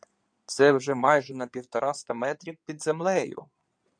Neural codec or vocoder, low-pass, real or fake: codec, 16 kHz in and 24 kHz out, 2.2 kbps, FireRedTTS-2 codec; 9.9 kHz; fake